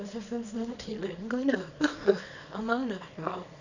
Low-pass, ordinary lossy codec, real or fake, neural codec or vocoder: 7.2 kHz; none; fake; codec, 24 kHz, 0.9 kbps, WavTokenizer, small release